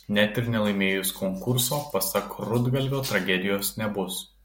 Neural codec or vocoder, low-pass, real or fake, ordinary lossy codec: none; 19.8 kHz; real; MP3, 64 kbps